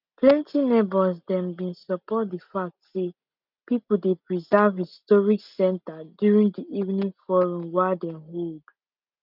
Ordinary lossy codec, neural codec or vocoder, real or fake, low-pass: none; none; real; 5.4 kHz